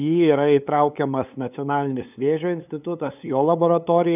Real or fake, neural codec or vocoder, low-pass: fake; codec, 16 kHz, 8 kbps, FunCodec, trained on LibriTTS, 25 frames a second; 3.6 kHz